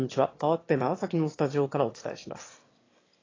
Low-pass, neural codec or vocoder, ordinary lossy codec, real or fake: 7.2 kHz; autoencoder, 22.05 kHz, a latent of 192 numbers a frame, VITS, trained on one speaker; AAC, 32 kbps; fake